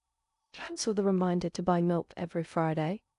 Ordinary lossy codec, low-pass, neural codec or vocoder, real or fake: none; 10.8 kHz; codec, 16 kHz in and 24 kHz out, 0.6 kbps, FocalCodec, streaming, 2048 codes; fake